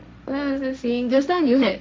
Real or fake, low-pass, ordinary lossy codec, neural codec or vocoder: fake; none; none; codec, 16 kHz, 1.1 kbps, Voila-Tokenizer